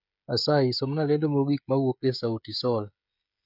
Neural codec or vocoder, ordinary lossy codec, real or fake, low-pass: codec, 16 kHz, 16 kbps, FreqCodec, smaller model; none; fake; 5.4 kHz